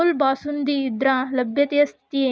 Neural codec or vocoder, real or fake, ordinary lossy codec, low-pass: none; real; none; none